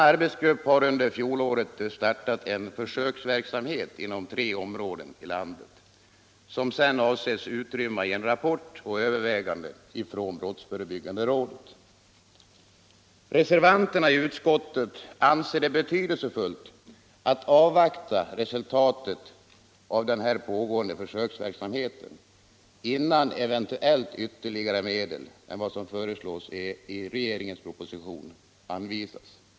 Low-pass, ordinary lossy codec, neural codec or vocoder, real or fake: none; none; none; real